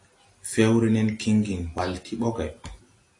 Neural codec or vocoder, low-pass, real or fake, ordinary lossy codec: none; 10.8 kHz; real; AAC, 48 kbps